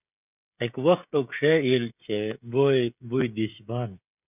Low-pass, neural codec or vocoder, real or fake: 3.6 kHz; codec, 16 kHz, 16 kbps, FreqCodec, smaller model; fake